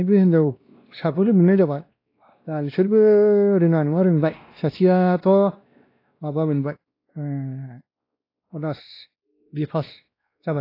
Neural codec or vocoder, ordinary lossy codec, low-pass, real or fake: codec, 16 kHz, 1 kbps, X-Codec, WavLM features, trained on Multilingual LibriSpeech; AAC, 32 kbps; 5.4 kHz; fake